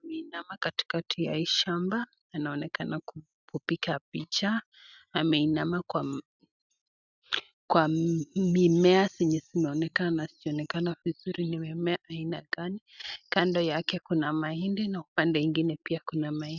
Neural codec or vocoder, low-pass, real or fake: none; 7.2 kHz; real